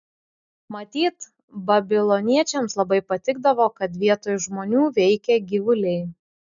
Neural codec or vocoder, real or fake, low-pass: none; real; 7.2 kHz